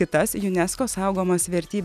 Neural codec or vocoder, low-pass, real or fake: none; 14.4 kHz; real